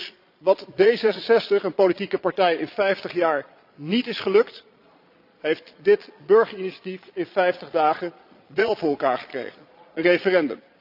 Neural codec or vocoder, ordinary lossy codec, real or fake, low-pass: vocoder, 22.05 kHz, 80 mel bands, Vocos; AAC, 48 kbps; fake; 5.4 kHz